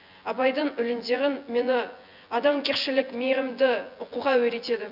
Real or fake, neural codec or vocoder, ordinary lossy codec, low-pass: fake; vocoder, 24 kHz, 100 mel bands, Vocos; none; 5.4 kHz